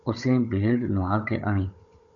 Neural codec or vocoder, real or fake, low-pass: codec, 16 kHz, 16 kbps, FunCodec, trained on Chinese and English, 50 frames a second; fake; 7.2 kHz